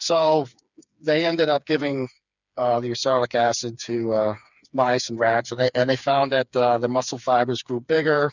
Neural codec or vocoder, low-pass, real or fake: codec, 16 kHz, 4 kbps, FreqCodec, smaller model; 7.2 kHz; fake